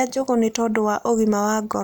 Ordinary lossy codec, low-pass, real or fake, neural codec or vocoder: none; none; real; none